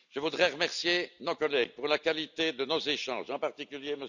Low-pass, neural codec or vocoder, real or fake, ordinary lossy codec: 7.2 kHz; none; real; none